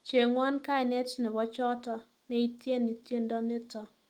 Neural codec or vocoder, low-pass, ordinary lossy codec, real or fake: autoencoder, 48 kHz, 128 numbers a frame, DAC-VAE, trained on Japanese speech; 19.8 kHz; Opus, 24 kbps; fake